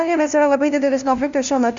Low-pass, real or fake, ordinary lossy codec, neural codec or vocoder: 7.2 kHz; fake; Opus, 64 kbps; codec, 16 kHz, 0.5 kbps, FunCodec, trained on LibriTTS, 25 frames a second